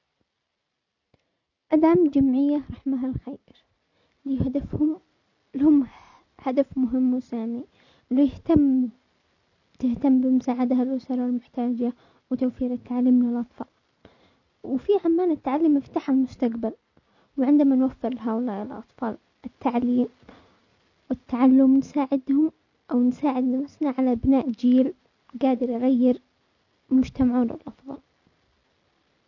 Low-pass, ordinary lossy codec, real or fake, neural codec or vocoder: 7.2 kHz; none; real; none